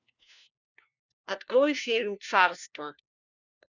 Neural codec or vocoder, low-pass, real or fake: codec, 16 kHz, 1 kbps, FunCodec, trained on LibriTTS, 50 frames a second; 7.2 kHz; fake